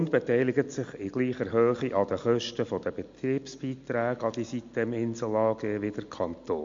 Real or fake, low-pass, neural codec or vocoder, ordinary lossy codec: real; 7.2 kHz; none; MP3, 48 kbps